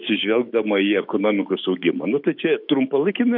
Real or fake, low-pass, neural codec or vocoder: fake; 5.4 kHz; autoencoder, 48 kHz, 128 numbers a frame, DAC-VAE, trained on Japanese speech